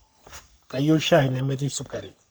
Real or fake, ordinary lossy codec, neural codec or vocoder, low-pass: fake; none; codec, 44.1 kHz, 3.4 kbps, Pupu-Codec; none